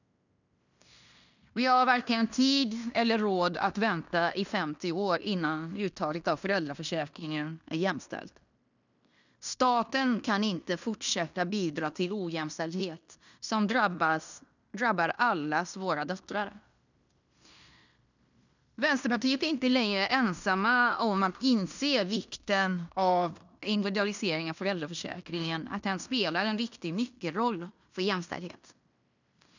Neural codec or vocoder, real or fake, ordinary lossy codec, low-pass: codec, 16 kHz in and 24 kHz out, 0.9 kbps, LongCat-Audio-Codec, fine tuned four codebook decoder; fake; none; 7.2 kHz